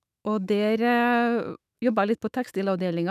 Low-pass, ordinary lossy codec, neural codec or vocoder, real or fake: 14.4 kHz; none; autoencoder, 48 kHz, 128 numbers a frame, DAC-VAE, trained on Japanese speech; fake